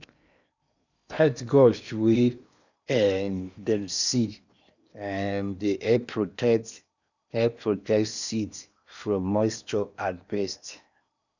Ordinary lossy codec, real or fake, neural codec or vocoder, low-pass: none; fake; codec, 16 kHz in and 24 kHz out, 0.8 kbps, FocalCodec, streaming, 65536 codes; 7.2 kHz